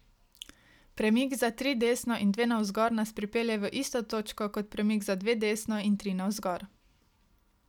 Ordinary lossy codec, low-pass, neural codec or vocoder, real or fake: none; 19.8 kHz; none; real